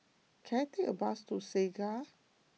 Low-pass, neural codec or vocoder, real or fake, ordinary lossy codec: none; none; real; none